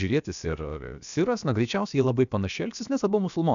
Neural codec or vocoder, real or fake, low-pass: codec, 16 kHz, about 1 kbps, DyCAST, with the encoder's durations; fake; 7.2 kHz